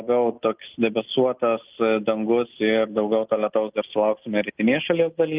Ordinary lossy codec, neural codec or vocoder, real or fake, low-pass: Opus, 32 kbps; none; real; 3.6 kHz